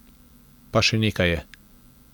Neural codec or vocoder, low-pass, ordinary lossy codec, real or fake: none; none; none; real